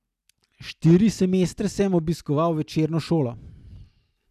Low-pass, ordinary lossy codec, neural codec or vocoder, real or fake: 14.4 kHz; none; none; real